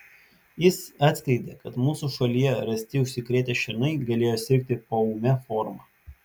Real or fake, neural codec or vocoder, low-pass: real; none; 19.8 kHz